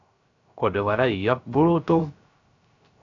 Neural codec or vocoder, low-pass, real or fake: codec, 16 kHz, 0.3 kbps, FocalCodec; 7.2 kHz; fake